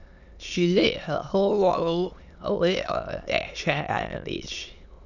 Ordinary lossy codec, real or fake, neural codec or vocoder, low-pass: none; fake; autoencoder, 22.05 kHz, a latent of 192 numbers a frame, VITS, trained on many speakers; 7.2 kHz